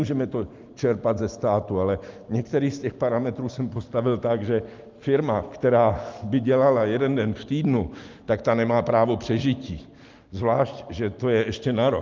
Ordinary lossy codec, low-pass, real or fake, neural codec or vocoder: Opus, 32 kbps; 7.2 kHz; real; none